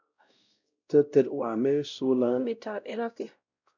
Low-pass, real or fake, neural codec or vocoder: 7.2 kHz; fake; codec, 16 kHz, 0.5 kbps, X-Codec, WavLM features, trained on Multilingual LibriSpeech